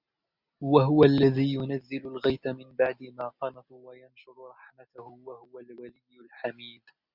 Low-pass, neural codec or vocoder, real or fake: 5.4 kHz; none; real